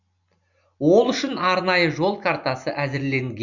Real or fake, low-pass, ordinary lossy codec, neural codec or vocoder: real; 7.2 kHz; none; none